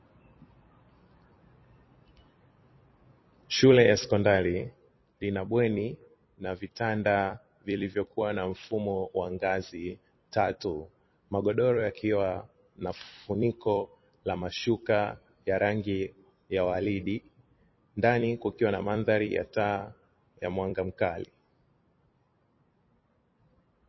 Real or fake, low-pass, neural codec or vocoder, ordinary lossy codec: fake; 7.2 kHz; vocoder, 44.1 kHz, 128 mel bands every 256 samples, BigVGAN v2; MP3, 24 kbps